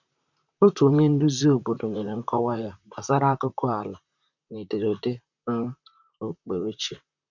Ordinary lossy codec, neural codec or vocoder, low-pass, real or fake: none; vocoder, 44.1 kHz, 128 mel bands, Pupu-Vocoder; 7.2 kHz; fake